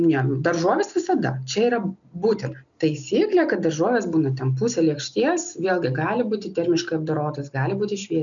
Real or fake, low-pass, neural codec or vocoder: real; 7.2 kHz; none